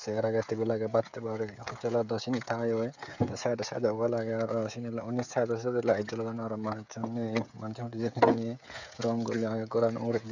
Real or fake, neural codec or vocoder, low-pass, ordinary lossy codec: fake; codec, 16 kHz, 16 kbps, FreqCodec, smaller model; 7.2 kHz; none